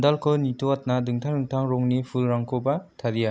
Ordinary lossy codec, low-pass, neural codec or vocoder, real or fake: none; none; none; real